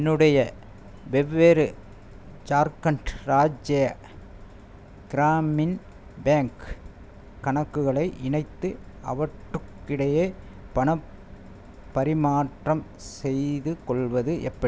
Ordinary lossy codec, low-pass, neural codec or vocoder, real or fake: none; none; none; real